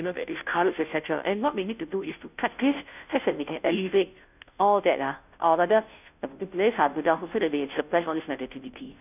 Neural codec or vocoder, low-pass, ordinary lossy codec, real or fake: codec, 16 kHz, 0.5 kbps, FunCodec, trained on Chinese and English, 25 frames a second; 3.6 kHz; none; fake